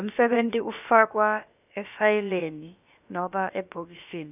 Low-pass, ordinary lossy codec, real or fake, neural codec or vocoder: 3.6 kHz; none; fake; codec, 16 kHz, about 1 kbps, DyCAST, with the encoder's durations